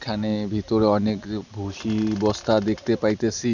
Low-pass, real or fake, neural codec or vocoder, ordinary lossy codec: 7.2 kHz; real; none; none